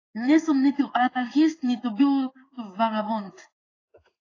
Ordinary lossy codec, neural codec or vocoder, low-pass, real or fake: AAC, 32 kbps; codec, 16 kHz in and 24 kHz out, 1 kbps, XY-Tokenizer; 7.2 kHz; fake